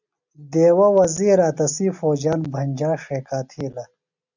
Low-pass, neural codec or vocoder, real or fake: 7.2 kHz; none; real